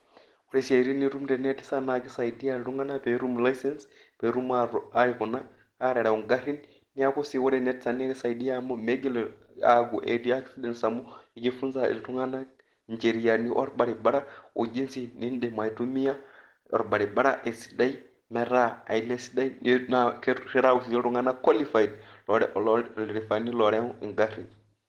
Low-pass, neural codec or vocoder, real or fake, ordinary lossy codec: 19.8 kHz; none; real; Opus, 16 kbps